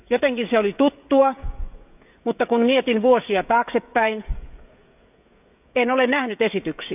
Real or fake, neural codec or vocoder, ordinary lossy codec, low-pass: fake; codec, 16 kHz, 16 kbps, FreqCodec, smaller model; none; 3.6 kHz